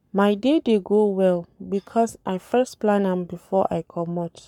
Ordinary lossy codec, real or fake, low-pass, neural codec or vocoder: none; fake; 19.8 kHz; codec, 44.1 kHz, 7.8 kbps, Pupu-Codec